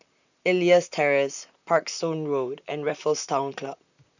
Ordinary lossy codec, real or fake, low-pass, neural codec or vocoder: none; fake; 7.2 kHz; vocoder, 44.1 kHz, 128 mel bands, Pupu-Vocoder